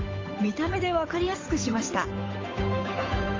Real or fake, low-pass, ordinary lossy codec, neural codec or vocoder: fake; 7.2 kHz; AAC, 32 kbps; codec, 16 kHz, 8 kbps, FunCodec, trained on Chinese and English, 25 frames a second